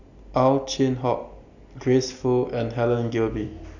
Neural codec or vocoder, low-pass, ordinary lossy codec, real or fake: none; 7.2 kHz; none; real